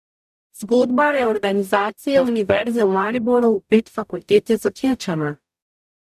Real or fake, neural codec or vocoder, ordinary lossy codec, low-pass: fake; codec, 44.1 kHz, 0.9 kbps, DAC; none; 14.4 kHz